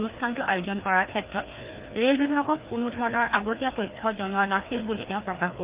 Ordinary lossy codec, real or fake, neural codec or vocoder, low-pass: Opus, 24 kbps; fake; codec, 16 kHz, 2 kbps, FreqCodec, larger model; 3.6 kHz